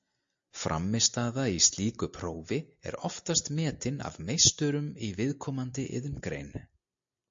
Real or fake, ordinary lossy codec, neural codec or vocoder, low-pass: real; MP3, 48 kbps; none; 7.2 kHz